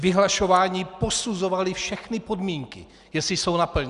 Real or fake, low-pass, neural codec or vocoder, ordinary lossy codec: real; 10.8 kHz; none; Opus, 64 kbps